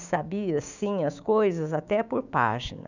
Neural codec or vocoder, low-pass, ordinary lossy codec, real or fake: codec, 16 kHz, 6 kbps, DAC; 7.2 kHz; none; fake